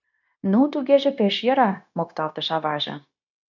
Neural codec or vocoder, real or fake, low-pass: codec, 16 kHz, 0.9 kbps, LongCat-Audio-Codec; fake; 7.2 kHz